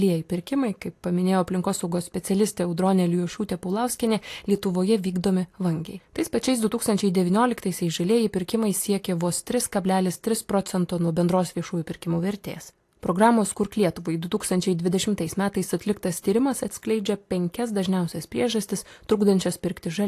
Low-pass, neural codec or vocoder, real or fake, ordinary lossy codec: 14.4 kHz; none; real; AAC, 64 kbps